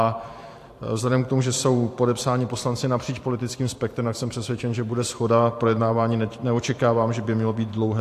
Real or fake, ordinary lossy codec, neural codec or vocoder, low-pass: fake; AAC, 64 kbps; vocoder, 44.1 kHz, 128 mel bands every 512 samples, BigVGAN v2; 14.4 kHz